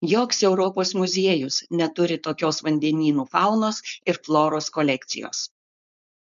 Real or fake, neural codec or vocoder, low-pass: fake; codec, 16 kHz, 4.8 kbps, FACodec; 7.2 kHz